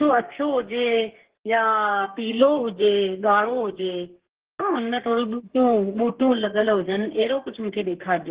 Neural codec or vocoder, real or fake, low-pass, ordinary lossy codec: codec, 32 kHz, 1.9 kbps, SNAC; fake; 3.6 kHz; Opus, 16 kbps